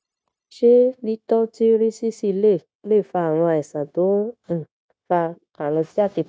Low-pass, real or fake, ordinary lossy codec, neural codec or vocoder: none; fake; none; codec, 16 kHz, 0.9 kbps, LongCat-Audio-Codec